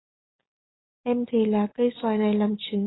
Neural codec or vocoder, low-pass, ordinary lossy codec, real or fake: none; 7.2 kHz; AAC, 16 kbps; real